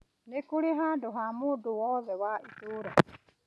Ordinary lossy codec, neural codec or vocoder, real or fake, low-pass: none; none; real; none